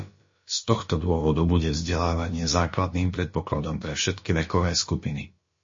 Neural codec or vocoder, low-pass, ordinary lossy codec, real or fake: codec, 16 kHz, about 1 kbps, DyCAST, with the encoder's durations; 7.2 kHz; MP3, 32 kbps; fake